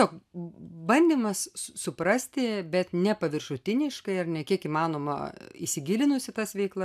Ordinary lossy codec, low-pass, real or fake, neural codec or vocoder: AAC, 96 kbps; 14.4 kHz; real; none